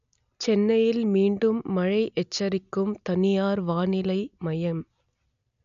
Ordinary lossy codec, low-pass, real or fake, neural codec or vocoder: none; 7.2 kHz; real; none